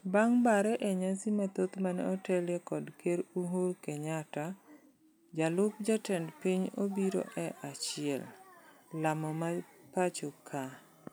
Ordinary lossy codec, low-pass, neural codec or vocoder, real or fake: none; none; none; real